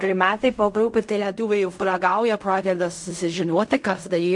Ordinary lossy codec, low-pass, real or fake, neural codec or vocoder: MP3, 64 kbps; 10.8 kHz; fake; codec, 16 kHz in and 24 kHz out, 0.4 kbps, LongCat-Audio-Codec, fine tuned four codebook decoder